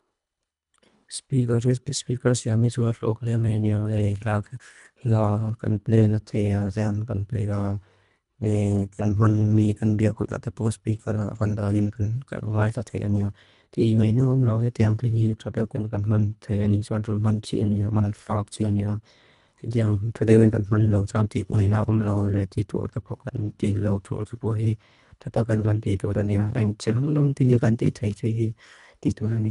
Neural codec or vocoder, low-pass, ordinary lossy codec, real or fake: codec, 24 kHz, 1.5 kbps, HILCodec; 10.8 kHz; MP3, 96 kbps; fake